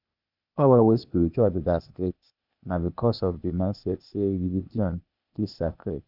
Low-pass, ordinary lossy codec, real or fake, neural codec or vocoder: 5.4 kHz; none; fake; codec, 16 kHz, 0.8 kbps, ZipCodec